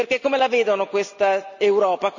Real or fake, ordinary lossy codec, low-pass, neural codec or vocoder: real; none; 7.2 kHz; none